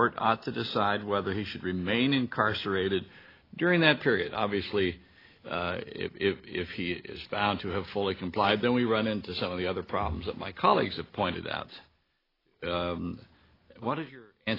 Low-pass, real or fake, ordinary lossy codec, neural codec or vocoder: 5.4 kHz; real; AAC, 24 kbps; none